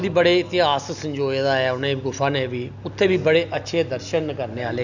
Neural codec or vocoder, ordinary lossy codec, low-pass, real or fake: none; none; 7.2 kHz; real